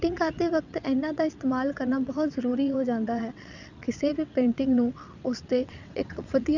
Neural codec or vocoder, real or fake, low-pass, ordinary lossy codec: vocoder, 22.05 kHz, 80 mel bands, WaveNeXt; fake; 7.2 kHz; none